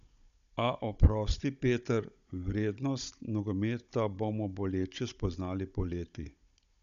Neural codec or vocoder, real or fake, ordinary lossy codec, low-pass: codec, 16 kHz, 16 kbps, FunCodec, trained on Chinese and English, 50 frames a second; fake; none; 7.2 kHz